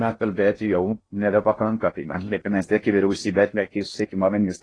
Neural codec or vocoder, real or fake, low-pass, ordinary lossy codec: codec, 16 kHz in and 24 kHz out, 0.6 kbps, FocalCodec, streaming, 2048 codes; fake; 9.9 kHz; AAC, 32 kbps